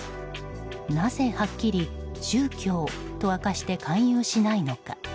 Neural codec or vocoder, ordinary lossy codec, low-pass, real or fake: none; none; none; real